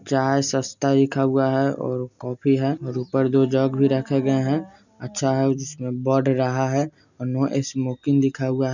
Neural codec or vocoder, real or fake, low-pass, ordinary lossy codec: none; real; 7.2 kHz; none